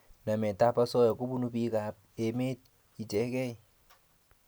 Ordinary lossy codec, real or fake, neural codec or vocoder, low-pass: none; real; none; none